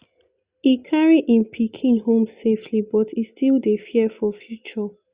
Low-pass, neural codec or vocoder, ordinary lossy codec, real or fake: 3.6 kHz; none; Opus, 64 kbps; real